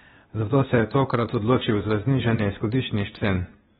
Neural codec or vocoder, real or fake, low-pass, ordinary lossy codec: codec, 16 kHz, 0.8 kbps, ZipCodec; fake; 7.2 kHz; AAC, 16 kbps